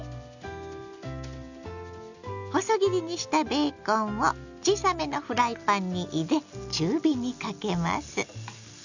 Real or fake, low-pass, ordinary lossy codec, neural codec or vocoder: real; 7.2 kHz; none; none